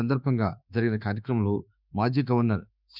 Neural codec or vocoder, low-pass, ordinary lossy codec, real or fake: autoencoder, 48 kHz, 32 numbers a frame, DAC-VAE, trained on Japanese speech; 5.4 kHz; none; fake